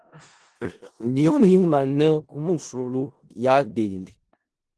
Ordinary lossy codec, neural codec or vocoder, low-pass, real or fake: Opus, 16 kbps; codec, 16 kHz in and 24 kHz out, 0.4 kbps, LongCat-Audio-Codec, four codebook decoder; 10.8 kHz; fake